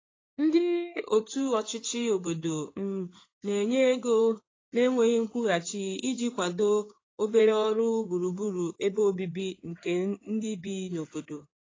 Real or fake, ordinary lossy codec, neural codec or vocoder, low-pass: fake; AAC, 32 kbps; codec, 16 kHz in and 24 kHz out, 2.2 kbps, FireRedTTS-2 codec; 7.2 kHz